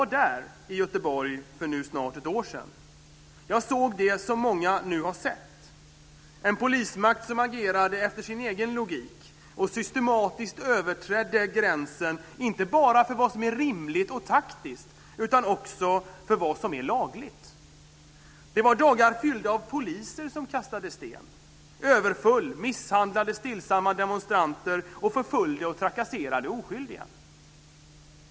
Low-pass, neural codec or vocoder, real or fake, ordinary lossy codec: none; none; real; none